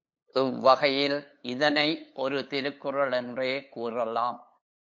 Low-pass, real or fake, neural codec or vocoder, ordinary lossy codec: 7.2 kHz; fake; codec, 16 kHz, 8 kbps, FunCodec, trained on LibriTTS, 25 frames a second; MP3, 48 kbps